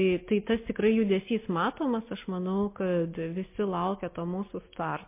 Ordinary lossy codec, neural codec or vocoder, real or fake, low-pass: MP3, 24 kbps; none; real; 3.6 kHz